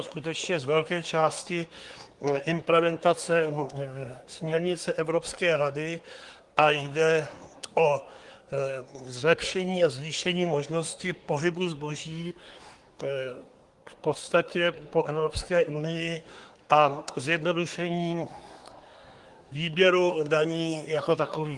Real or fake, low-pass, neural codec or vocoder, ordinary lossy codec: fake; 10.8 kHz; codec, 24 kHz, 1 kbps, SNAC; Opus, 24 kbps